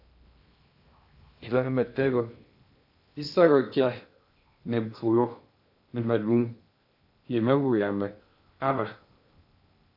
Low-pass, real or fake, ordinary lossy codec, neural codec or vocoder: 5.4 kHz; fake; AAC, 48 kbps; codec, 16 kHz in and 24 kHz out, 0.6 kbps, FocalCodec, streaming, 2048 codes